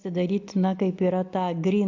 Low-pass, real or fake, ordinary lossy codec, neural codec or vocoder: 7.2 kHz; real; AAC, 48 kbps; none